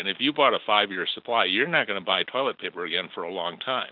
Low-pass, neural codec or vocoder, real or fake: 5.4 kHz; none; real